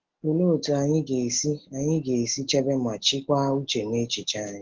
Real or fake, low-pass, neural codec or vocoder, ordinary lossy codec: real; 7.2 kHz; none; Opus, 16 kbps